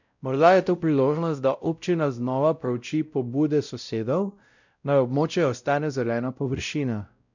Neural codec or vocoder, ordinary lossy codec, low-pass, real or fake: codec, 16 kHz, 0.5 kbps, X-Codec, WavLM features, trained on Multilingual LibriSpeech; none; 7.2 kHz; fake